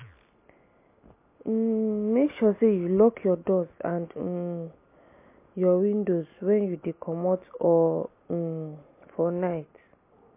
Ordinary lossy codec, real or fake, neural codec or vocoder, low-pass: MP3, 24 kbps; real; none; 3.6 kHz